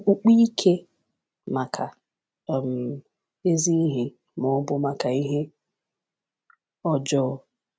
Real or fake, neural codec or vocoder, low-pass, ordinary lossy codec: real; none; none; none